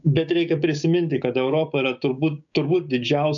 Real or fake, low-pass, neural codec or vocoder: fake; 7.2 kHz; codec, 16 kHz, 6 kbps, DAC